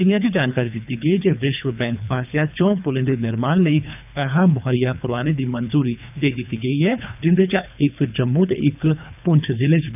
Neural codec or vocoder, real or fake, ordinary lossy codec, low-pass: codec, 24 kHz, 3 kbps, HILCodec; fake; none; 3.6 kHz